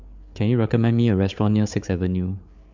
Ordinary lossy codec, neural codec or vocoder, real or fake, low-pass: MP3, 64 kbps; codec, 16 kHz, 8 kbps, FreqCodec, larger model; fake; 7.2 kHz